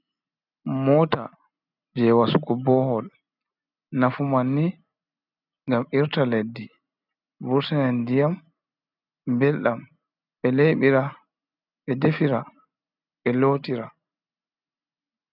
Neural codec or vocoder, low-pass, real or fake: none; 5.4 kHz; real